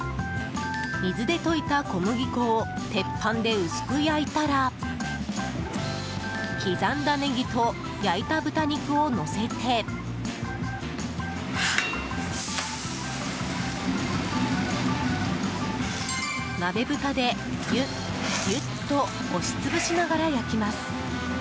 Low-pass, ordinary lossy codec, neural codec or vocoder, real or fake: none; none; none; real